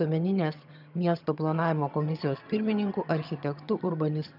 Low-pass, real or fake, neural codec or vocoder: 5.4 kHz; fake; vocoder, 22.05 kHz, 80 mel bands, HiFi-GAN